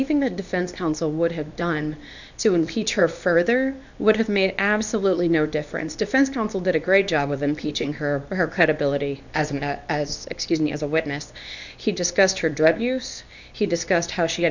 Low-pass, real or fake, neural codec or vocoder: 7.2 kHz; fake; codec, 24 kHz, 0.9 kbps, WavTokenizer, medium speech release version 2